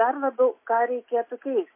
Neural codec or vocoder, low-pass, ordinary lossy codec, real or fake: none; 3.6 kHz; MP3, 24 kbps; real